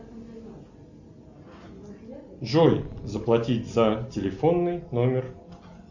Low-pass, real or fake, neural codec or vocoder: 7.2 kHz; real; none